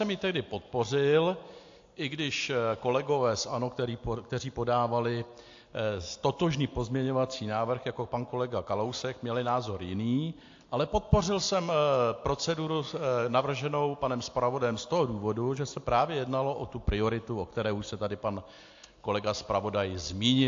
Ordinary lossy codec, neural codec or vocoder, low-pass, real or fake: AAC, 48 kbps; none; 7.2 kHz; real